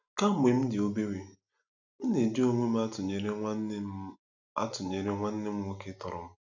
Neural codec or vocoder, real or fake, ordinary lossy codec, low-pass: none; real; none; 7.2 kHz